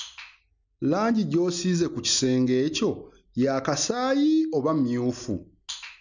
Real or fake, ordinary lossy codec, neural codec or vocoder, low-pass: real; none; none; 7.2 kHz